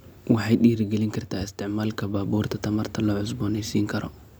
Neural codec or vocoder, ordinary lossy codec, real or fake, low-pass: none; none; real; none